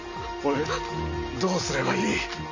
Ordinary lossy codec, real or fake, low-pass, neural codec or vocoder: none; real; 7.2 kHz; none